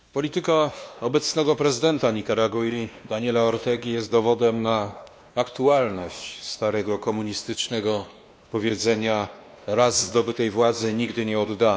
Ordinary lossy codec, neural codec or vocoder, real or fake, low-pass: none; codec, 16 kHz, 2 kbps, X-Codec, WavLM features, trained on Multilingual LibriSpeech; fake; none